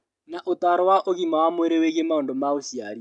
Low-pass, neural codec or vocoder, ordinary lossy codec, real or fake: 10.8 kHz; none; MP3, 96 kbps; real